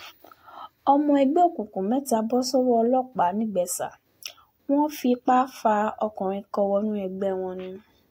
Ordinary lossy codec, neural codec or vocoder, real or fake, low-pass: AAC, 48 kbps; none; real; 19.8 kHz